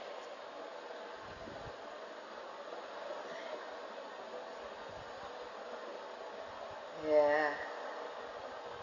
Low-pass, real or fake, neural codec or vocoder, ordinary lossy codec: 7.2 kHz; real; none; Opus, 64 kbps